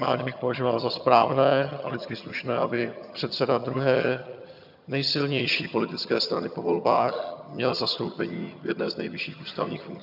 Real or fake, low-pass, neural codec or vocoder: fake; 5.4 kHz; vocoder, 22.05 kHz, 80 mel bands, HiFi-GAN